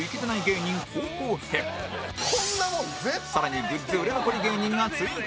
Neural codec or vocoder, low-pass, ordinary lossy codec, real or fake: none; none; none; real